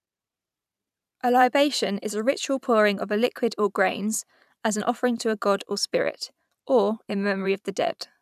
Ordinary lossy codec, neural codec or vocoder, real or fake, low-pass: none; vocoder, 44.1 kHz, 128 mel bands every 512 samples, BigVGAN v2; fake; 14.4 kHz